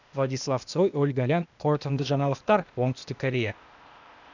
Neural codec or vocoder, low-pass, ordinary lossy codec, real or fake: codec, 16 kHz, 0.8 kbps, ZipCodec; 7.2 kHz; none; fake